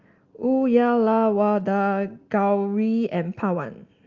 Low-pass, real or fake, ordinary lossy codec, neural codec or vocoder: 7.2 kHz; real; Opus, 32 kbps; none